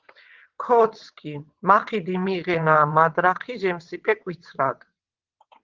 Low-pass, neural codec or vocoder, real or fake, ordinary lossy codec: 7.2 kHz; vocoder, 22.05 kHz, 80 mel bands, WaveNeXt; fake; Opus, 16 kbps